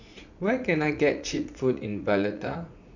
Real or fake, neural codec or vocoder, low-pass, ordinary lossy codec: fake; vocoder, 44.1 kHz, 80 mel bands, Vocos; 7.2 kHz; none